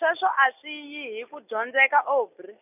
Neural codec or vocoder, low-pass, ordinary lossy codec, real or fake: none; 3.6 kHz; none; real